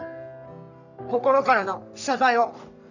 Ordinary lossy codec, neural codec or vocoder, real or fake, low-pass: none; codec, 44.1 kHz, 3.4 kbps, Pupu-Codec; fake; 7.2 kHz